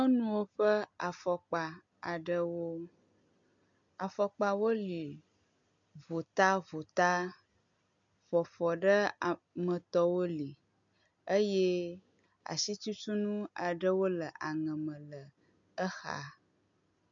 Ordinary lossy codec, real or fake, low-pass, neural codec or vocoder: MP3, 64 kbps; real; 7.2 kHz; none